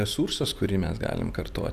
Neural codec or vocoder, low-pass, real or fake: none; 14.4 kHz; real